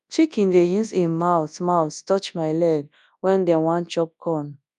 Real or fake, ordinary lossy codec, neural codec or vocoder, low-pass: fake; none; codec, 24 kHz, 0.9 kbps, WavTokenizer, large speech release; 10.8 kHz